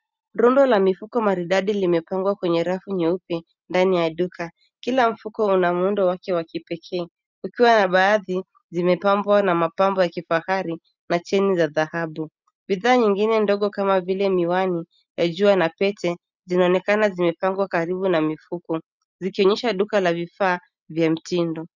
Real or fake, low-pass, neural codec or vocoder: real; 7.2 kHz; none